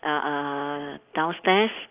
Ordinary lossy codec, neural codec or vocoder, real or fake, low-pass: Opus, 24 kbps; none; real; 3.6 kHz